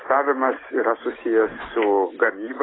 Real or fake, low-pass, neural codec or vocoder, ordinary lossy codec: real; 7.2 kHz; none; AAC, 16 kbps